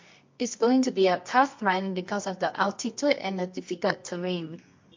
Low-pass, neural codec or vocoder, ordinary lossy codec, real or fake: 7.2 kHz; codec, 24 kHz, 0.9 kbps, WavTokenizer, medium music audio release; MP3, 48 kbps; fake